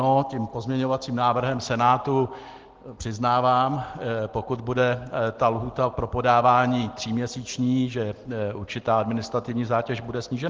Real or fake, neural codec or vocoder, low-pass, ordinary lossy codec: real; none; 7.2 kHz; Opus, 16 kbps